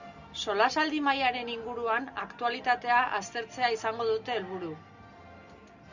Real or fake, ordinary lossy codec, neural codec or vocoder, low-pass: real; Opus, 64 kbps; none; 7.2 kHz